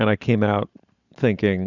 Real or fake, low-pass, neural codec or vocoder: fake; 7.2 kHz; vocoder, 44.1 kHz, 128 mel bands every 512 samples, BigVGAN v2